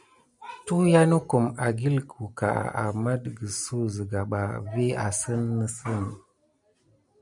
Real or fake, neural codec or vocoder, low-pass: real; none; 10.8 kHz